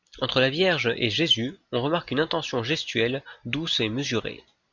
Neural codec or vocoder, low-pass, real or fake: none; 7.2 kHz; real